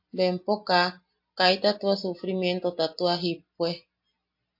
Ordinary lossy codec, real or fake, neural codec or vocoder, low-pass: MP3, 32 kbps; real; none; 5.4 kHz